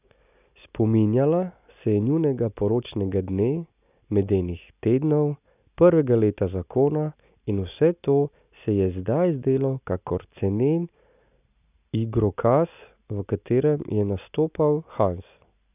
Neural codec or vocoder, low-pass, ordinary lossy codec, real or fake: none; 3.6 kHz; none; real